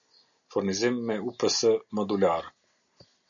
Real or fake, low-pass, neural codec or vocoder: real; 7.2 kHz; none